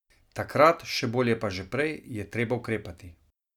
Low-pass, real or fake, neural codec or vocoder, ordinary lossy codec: 19.8 kHz; real; none; none